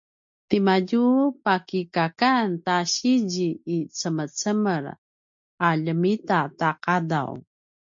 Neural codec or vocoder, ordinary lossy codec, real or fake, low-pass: none; MP3, 48 kbps; real; 7.2 kHz